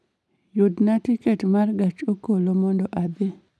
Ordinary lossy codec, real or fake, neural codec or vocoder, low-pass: none; real; none; none